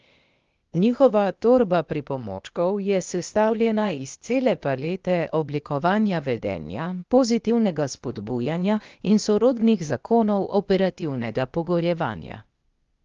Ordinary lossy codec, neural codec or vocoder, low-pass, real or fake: Opus, 32 kbps; codec, 16 kHz, 0.8 kbps, ZipCodec; 7.2 kHz; fake